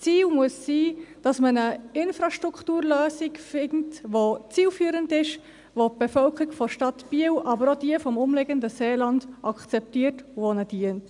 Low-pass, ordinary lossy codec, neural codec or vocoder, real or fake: 10.8 kHz; MP3, 96 kbps; none; real